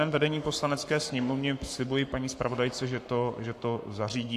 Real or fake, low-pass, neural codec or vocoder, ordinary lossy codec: fake; 14.4 kHz; codec, 44.1 kHz, 7.8 kbps, Pupu-Codec; AAC, 64 kbps